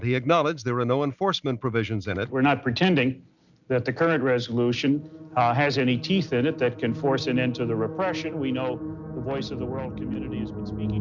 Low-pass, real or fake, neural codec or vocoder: 7.2 kHz; real; none